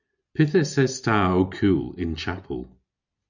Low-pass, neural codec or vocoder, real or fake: 7.2 kHz; none; real